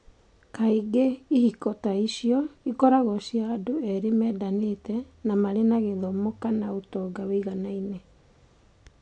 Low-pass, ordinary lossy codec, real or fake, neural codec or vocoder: 9.9 kHz; AAC, 64 kbps; real; none